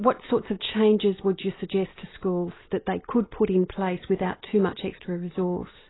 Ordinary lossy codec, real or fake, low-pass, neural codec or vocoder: AAC, 16 kbps; real; 7.2 kHz; none